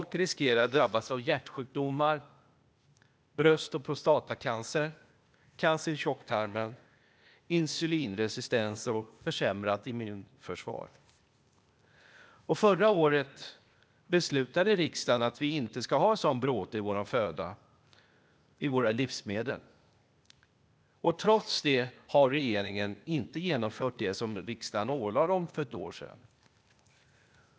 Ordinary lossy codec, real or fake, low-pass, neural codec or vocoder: none; fake; none; codec, 16 kHz, 0.8 kbps, ZipCodec